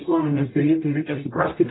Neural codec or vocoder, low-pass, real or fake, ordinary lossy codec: codec, 44.1 kHz, 0.9 kbps, DAC; 7.2 kHz; fake; AAC, 16 kbps